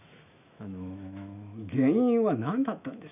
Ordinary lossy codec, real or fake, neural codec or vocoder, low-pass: none; fake; autoencoder, 48 kHz, 128 numbers a frame, DAC-VAE, trained on Japanese speech; 3.6 kHz